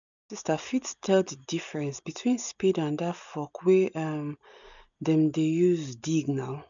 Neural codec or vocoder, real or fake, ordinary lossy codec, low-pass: none; real; none; 7.2 kHz